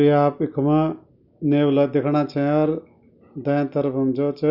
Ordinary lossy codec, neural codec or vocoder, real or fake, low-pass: none; none; real; 5.4 kHz